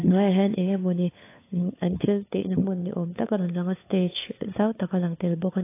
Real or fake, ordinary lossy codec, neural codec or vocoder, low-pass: fake; AAC, 24 kbps; codec, 16 kHz, 4 kbps, FunCodec, trained on LibriTTS, 50 frames a second; 3.6 kHz